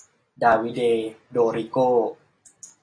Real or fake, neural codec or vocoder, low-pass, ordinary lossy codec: real; none; 9.9 kHz; AAC, 32 kbps